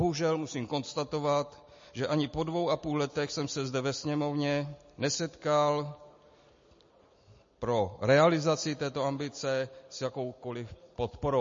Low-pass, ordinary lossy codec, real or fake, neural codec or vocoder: 7.2 kHz; MP3, 32 kbps; real; none